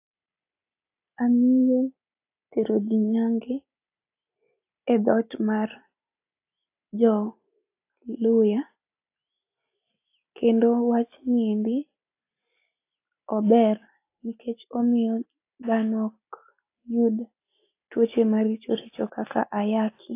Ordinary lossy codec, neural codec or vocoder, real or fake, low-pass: AAC, 24 kbps; none; real; 3.6 kHz